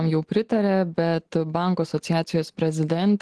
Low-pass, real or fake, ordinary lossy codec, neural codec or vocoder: 10.8 kHz; real; Opus, 16 kbps; none